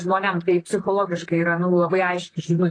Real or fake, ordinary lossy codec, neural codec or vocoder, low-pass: fake; AAC, 32 kbps; vocoder, 44.1 kHz, 128 mel bands, Pupu-Vocoder; 9.9 kHz